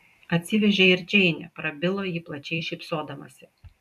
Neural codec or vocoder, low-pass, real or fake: vocoder, 44.1 kHz, 128 mel bands every 256 samples, BigVGAN v2; 14.4 kHz; fake